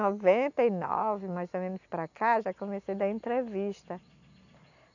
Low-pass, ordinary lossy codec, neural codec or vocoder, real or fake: 7.2 kHz; none; none; real